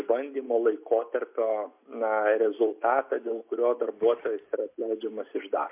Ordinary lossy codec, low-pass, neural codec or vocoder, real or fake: MP3, 32 kbps; 3.6 kHz; none; real